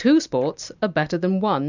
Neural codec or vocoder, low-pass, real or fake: none; 7.2 kHz; real